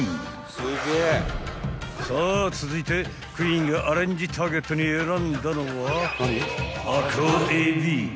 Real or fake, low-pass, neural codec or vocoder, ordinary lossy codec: real; none; none; none